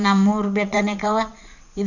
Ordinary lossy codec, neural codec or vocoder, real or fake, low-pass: none; vocoder, 44.1 kHz, 80 mel bands, Vocos; fake; 7.2 kHz